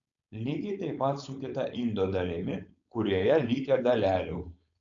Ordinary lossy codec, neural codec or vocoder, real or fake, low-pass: AAC, 64 kbps; codec, 16 kHz, 4.8 kbps, FACodec; fake; 7.2 kHz